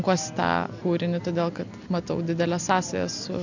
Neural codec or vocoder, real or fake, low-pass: none; real; 7.2 kHz